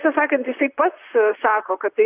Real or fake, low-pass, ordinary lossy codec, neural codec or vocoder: fake; 3.6 kHz; Opus, 64 kbps; vocoder, 44.1 kHz, 128 mel bands, Pupu-Vocoder